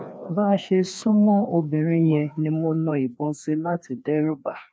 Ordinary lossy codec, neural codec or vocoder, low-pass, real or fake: none; codec, 16 kHz, 2 kbps, FreqCodec, larger model; none; fake